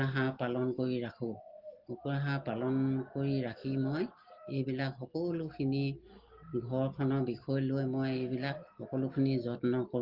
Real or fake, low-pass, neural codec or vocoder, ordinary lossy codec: real; 5.4 kHz; none; Opus, 16 kbps